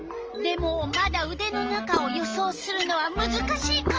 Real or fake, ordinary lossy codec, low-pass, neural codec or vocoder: real; Opus, 24 kbps; 7.2 kHz; none